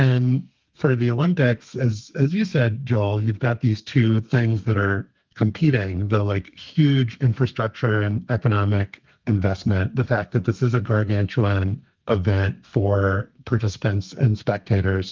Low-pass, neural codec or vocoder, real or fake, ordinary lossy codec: 7.2 kHz; codec, 32 kHz, 1.9 kbps, SNAC; fake; Opus, 24 kbps